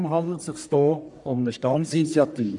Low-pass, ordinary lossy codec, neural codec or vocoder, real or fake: 10.8 kHz; none; codec, 44.1 kHz, 1.7 kbps, Pupu-Codec; fake